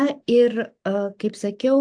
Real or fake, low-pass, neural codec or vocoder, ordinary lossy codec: fake; 9.9 kHz; vocoder, 44.1 kHz, 128 mel bands every 512 samples, BigVGAN v2; AAC, 64 kbps